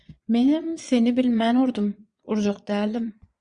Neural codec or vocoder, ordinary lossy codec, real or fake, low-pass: vocoder, 22.05 kHz, 80 mel bands, Vocos; Opus, 64 kbps; fake; 9.9 kHz